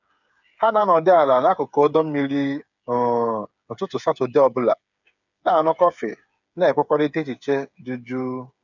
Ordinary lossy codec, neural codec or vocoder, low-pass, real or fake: none; codec, 16 kHz, 8 kbps, FreqCodec, smaller model; 7.2 kHz; fake